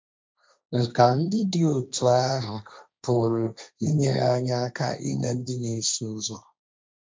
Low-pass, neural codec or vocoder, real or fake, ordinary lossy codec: none; codec, 16 kHz, 1.1 kbps, Voila-Tokenizer; fake; none